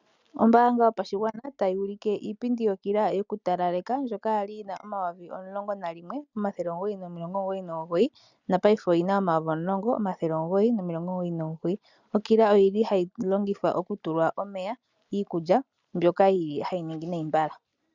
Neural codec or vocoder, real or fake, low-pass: none; real; 7.2 kHz